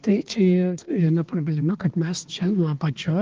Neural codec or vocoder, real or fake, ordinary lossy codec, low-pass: codec, 16 kHz, 2 kbps, X-Codec, HuBERT features, trained on balanced general audio; fake; Opus, 16 kbps; 7.2 kHz